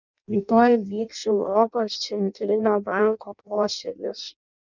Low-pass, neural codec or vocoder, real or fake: 7.2 kHz; codec, 16 kHz in and 24 kHz out, 0.6 kbps, FireRedTTS-2 codec; fake